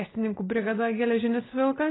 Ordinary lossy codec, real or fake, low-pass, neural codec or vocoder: AAC, 16 kbps; real; 7.2 kHz; none